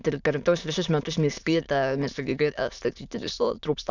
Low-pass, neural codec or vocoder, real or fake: 7.2 kHz; autoencoder, 22.05 kHz, a latent of 192 numbers a frame, VITS, trained on many speakers; fake